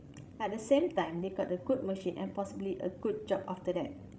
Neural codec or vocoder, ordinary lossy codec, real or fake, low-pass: codec, 16 kHz, 16 kbps, FreqCodec, larger model; none; fake; none